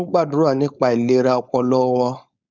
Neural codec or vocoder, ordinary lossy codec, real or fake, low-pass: codec, 16 kHz, 4.8 kbps, FACodec; Opus, 64 kbps; fake; 7.2 kHz